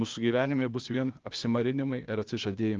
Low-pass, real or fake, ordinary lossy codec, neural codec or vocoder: 7.2 kHz; fake; Opus, 32 kbps; codec, 16 kHz, 0.8 kbps, ZipCodec